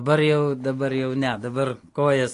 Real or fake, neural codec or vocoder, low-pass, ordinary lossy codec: real; none; 10.8 kHz; AAC, 48 kbps